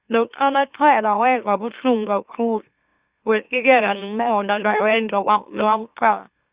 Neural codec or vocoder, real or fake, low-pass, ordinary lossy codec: autoencoder, 44.1 kHz, a latent of 192 numbers a frame, MeloTTS; fake; 3.6 kHz; Opus, 64 kbps